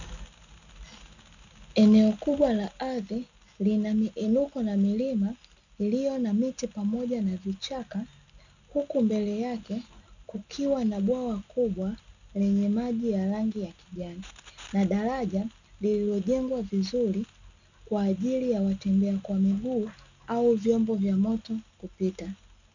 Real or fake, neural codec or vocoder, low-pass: real; none; 7.2 kHz